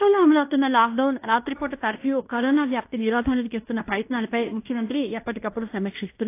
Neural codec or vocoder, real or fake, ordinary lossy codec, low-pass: codec, 16 kHz in and 24 kHz out, 0.9 kbps, LongCat-Audio-Codec, fine tuned four codebook decoder; fake; AAC, 24 kbps; 3.6 kHz